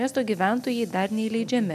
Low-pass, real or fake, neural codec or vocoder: 14.4 kHz; real; none